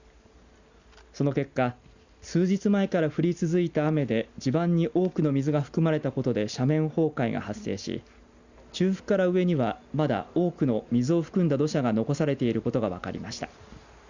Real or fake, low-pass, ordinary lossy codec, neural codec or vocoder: real; 7.2 kHz; Opus, 64 kbps; none